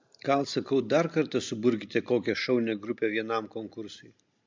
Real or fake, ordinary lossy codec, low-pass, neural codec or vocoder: real; MP3, 64 kbps; 7.2 kHz; none